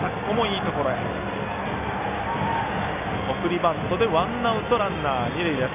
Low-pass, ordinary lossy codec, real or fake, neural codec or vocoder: 3.6 kHz; none; real; none